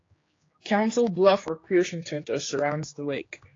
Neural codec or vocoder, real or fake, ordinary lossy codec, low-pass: codec, 16 kHz, 2 kbps, X-Codec, HuBERT features, trained on general audio; fake; AAC, 32 kbps; 7.2 kHz